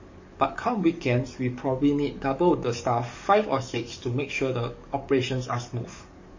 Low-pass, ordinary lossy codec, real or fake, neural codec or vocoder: 7.2 kHz; MP3, 32 kbps; fake; codec, 44.1 kHz, 7.8 kbps, DAC